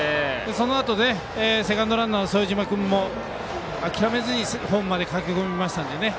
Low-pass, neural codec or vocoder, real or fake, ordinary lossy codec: none; none; real; none